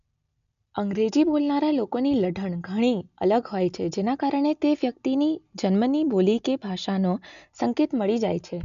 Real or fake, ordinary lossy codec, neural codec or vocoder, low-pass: real; none; none; 7.2 kHz